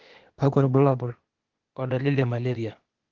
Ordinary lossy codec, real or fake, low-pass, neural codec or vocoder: Opus, 16 kbps; fake; 7.2 kHz; codec, 16 kHz, 0.8 kbps, ZipCodec